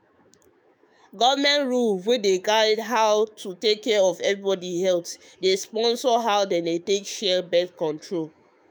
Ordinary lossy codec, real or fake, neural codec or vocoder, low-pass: none; fake; autoencoder, 48 kHz, 128 numbers a frame, DAC-VAE, trained on Japanese speech; none